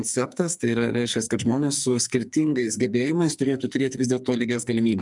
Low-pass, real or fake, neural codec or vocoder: 10.8 kHz; fake; codec, 44.1 kHz, 2.6 kbps, SNAC